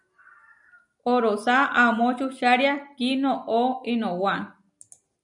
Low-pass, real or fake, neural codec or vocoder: 10.8 kHz; real; none